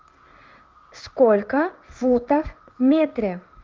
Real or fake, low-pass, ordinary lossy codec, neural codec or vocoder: fake; 7.2 kHz; Opus, 32 kbps; codec, 16 kHz in and 24 kHz out, 1 kbps, XY-Tokenizer